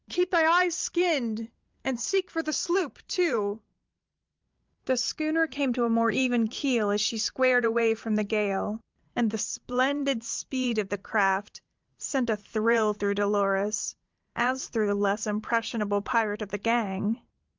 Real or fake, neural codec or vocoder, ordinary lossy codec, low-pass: fake; vocoder, 44.1 kHz, 128 mel bands every 512 samples, BigVGAN v2; Opus, 24 kbps; 7.2 kHz